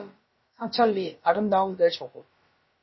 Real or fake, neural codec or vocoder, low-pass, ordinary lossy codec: fake; codec, 16 kHz, about 1 kbps, DyCAST, with the encoder's durations; 7.2 kHz; MP3, 24 kbps